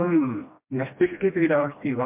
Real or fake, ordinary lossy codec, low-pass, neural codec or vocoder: fake; MP3, 32 kbps; 3.6 kHz; codec, 16 kHz, 1 kbps, FreqCodec, smaller model